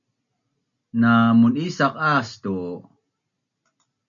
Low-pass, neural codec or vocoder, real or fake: 7.2 kHz; none; real